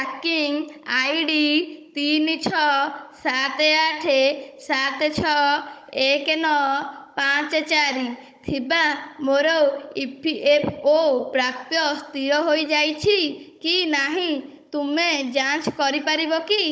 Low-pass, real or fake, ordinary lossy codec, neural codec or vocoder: none; fake; none; codec, 16 kHz, 16 kbps, FunCodec, trained on Chinese and English, 50 frames a second